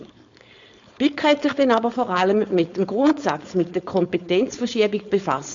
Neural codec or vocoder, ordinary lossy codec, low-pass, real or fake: codec, 16 kHz, 4.8 kbps, FACodec; MP3, 64 kbps; 7.2 kHz; fake